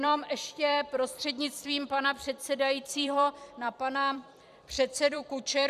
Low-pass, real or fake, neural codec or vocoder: 14.4 kHz; fake; vocoder, 44.1 kHz, 128 mel bands every 256 samples, BigVGAN v2